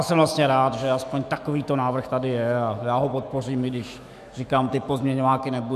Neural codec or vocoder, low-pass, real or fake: autoencoder, 48 kHz, 128 numbers a frame, DAC-VAE, trained on Japanese speech; 14.4 kHz; fake